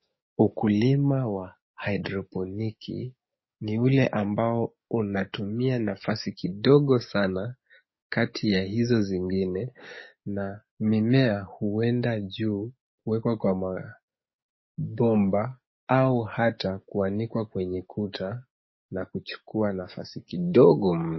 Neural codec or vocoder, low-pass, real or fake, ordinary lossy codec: codec, 44.1 kHz, 7.8 kbps, DAC; 7.2 kHz; fake; MP3, 24 kbps